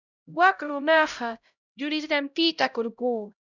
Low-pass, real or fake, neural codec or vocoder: 7.2 kHz; fake; codec, 16 kHz, 0.5 kbps, X-Codec, HuBERT features, trained on LibriSpeech